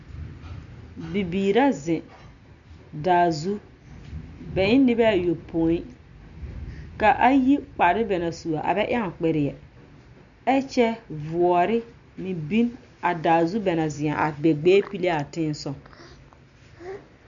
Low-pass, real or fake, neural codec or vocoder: 7.2 kHz; real; none